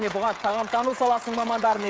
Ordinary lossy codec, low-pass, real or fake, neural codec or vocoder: none; none; fake; codec, 16 kHz, 16 kbps, FreqCodec, smaller model